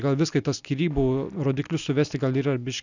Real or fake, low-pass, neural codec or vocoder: real; 7.2 kHz; none